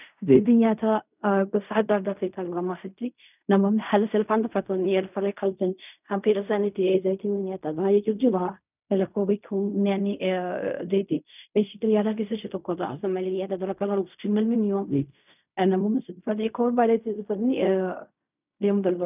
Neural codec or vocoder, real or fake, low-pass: codec, 16 kHz in and 24 kHz out, 0.4 kbps, LongCat-Audio-Codec, fine tuned four codebook decoder; fake; 3.6 kHz